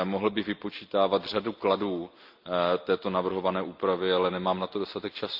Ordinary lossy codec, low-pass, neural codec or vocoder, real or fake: Opus, 24 kbps; 5.4 kHz; none; real